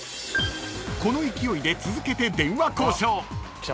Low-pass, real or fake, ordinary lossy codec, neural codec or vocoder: none; real; none; none